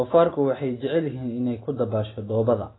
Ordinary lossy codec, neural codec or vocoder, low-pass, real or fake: AAC, 16 kbps; none; 7.2 kHz; real